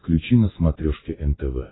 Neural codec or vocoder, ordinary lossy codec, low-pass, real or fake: none; AAC, 16 kbps; 7.2 kHz; real